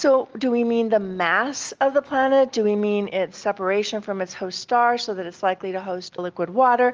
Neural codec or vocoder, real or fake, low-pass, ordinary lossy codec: none; real; 7.2 kHz; Opus, 24 kbps